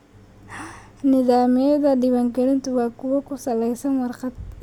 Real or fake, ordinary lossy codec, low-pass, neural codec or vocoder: real; none; 19.8 kHz; none